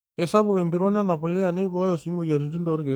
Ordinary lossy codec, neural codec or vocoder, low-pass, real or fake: none; codec, 44.1 kHz, 2.6 kbps, SNAC; none; fake